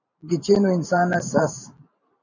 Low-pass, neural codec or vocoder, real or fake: 7.2 kHz; vocoder, 44.1 kHz, 128 mel bands every 512 samples, BigVGAN v2; fake